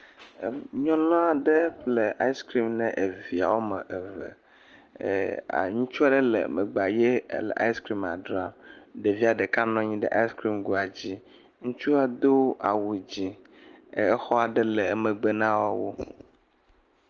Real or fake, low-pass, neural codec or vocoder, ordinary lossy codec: real; 7.2 kHz; none; Opus, 24 kbps